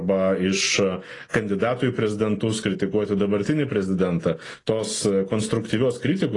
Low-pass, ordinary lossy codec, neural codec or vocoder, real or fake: 10.8 kHz; AAC, 32 kbps; none; real